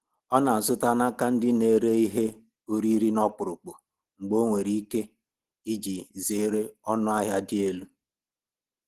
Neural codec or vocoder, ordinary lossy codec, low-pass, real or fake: none; Opus, 16 kbps; 14.4 kHz; real